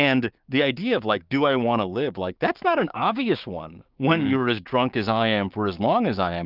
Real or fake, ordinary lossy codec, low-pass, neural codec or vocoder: fake; Opus, 24 kbps; 5.4 kHz; codec, 16 kHz, 6 kbps, DAC